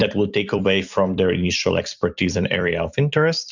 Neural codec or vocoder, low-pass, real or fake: vocoder, 44.1 kHz, 128 mel bands every 256 samples, BigVGAN v2; 7.2 kHz; fake